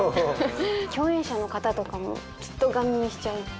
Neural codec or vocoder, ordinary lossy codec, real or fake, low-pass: none; none; real; none